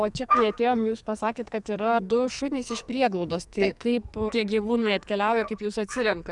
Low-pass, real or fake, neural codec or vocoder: 10.8 kHz; fake; codec, 44.1 kHz, 2.6 kbps, SNAC